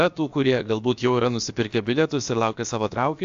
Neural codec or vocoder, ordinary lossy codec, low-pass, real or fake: codec, 16 kHz, about 1 kbps, DyCAST, with the encoder's durations; AAC, 64 kbps; 7.2 kHz; fake